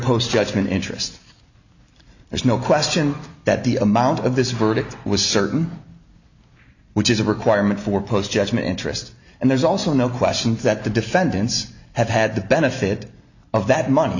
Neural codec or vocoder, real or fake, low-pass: none; real; 7.2 kHz